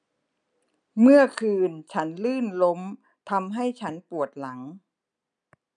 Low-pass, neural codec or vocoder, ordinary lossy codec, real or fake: 9.9 kHz; none; none; real